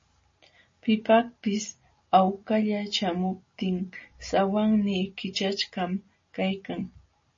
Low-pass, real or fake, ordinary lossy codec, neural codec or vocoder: 7.2 kHz; real; MP3, 32 kbps; none